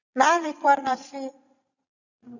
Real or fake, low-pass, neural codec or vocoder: fake; 7.2 kHz; vocoder, 22.05 kHz, 80 mel bands, Vocos